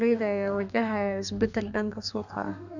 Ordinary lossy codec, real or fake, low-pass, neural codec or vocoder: none; fake; 7.2 kHz; codec, 32 kHz, 1.9 kbps, SNAC